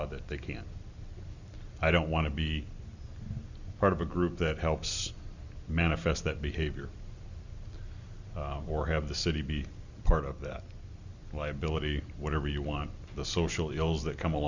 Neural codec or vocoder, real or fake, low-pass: none; real; 7.2 kHz